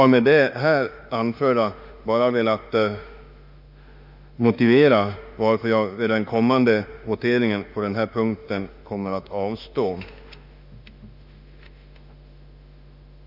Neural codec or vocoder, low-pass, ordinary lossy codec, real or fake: autoencoder, 48 kHz, 32 numbers a frame, DAC-VAE, trained on Japanese speech; 5.4 kHz; Opus, 64 kbps; fake